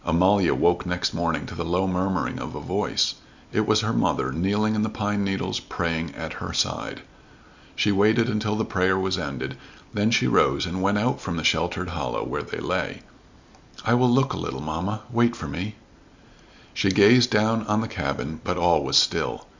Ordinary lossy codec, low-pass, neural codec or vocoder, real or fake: Opus, 64 kbps; 7.2 kHz; none; real